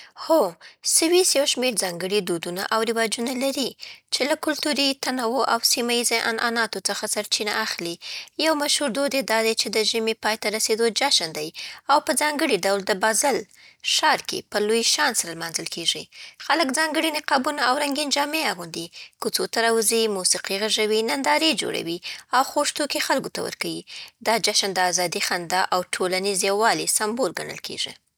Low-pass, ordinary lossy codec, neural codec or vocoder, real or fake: none; none; none; real